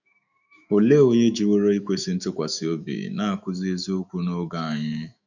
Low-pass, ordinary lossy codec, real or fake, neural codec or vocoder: 7.2 kHz; none; fake; autoencoder, 48 kHz, 128 numbers a frame, DAC-VAE, trained on Japanese speech